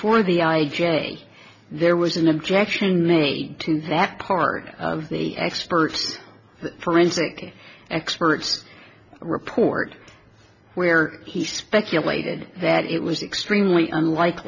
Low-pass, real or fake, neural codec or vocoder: 7.2 kHz; real; none